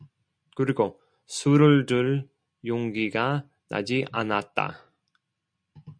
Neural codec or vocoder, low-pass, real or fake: none; 9.9 kHz; real